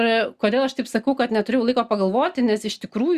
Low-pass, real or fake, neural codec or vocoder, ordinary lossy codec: 14.4 kHz; real; none; Opus, 64 kbps